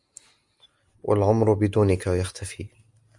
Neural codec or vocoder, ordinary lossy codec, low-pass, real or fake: none; Opus, 64 kbps; 10.8 kHz; real